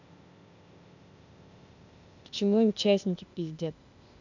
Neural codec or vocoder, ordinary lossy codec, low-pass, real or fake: codec, 16 kHz, 0.8 kbps, ZipCodec; none; 7.2 kHz; fake